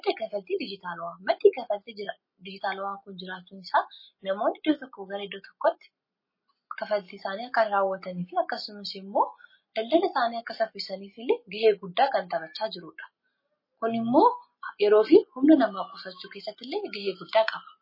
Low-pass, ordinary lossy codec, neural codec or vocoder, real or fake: 5.4 kHz; MP3, 24 kbps; none; real